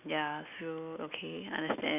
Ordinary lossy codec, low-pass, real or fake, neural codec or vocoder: none; 3.6 kHz; real; none